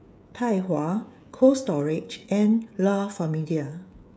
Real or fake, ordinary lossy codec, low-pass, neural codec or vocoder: fake; none; none; codec, 16 kHz, 16 kbps, FreqCodec, smaller model